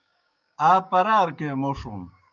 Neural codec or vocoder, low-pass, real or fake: codec, 16 kHz, 6 kbps, DAC; 7.2 kHz; fake